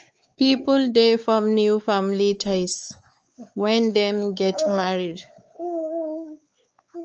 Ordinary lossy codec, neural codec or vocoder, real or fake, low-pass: Opus, 24 kbps; codec, 16 kHz, 4 kbps, X-Codec, WavLM features, trained on Multilingual LibriSpeech; fake; 7.2 kHz